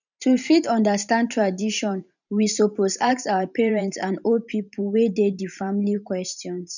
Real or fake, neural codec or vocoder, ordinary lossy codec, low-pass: fake; vocoder, 44.1 kHz, 128 mel bands every 512 samples, BigVGAN v2; none; 7.2 kHz